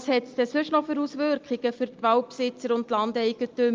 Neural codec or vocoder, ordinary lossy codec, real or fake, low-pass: none; Opus, 24 kbps; real; 7.2 kHz